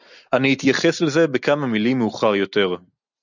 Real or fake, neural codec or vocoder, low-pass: real; none; 7.2 kHz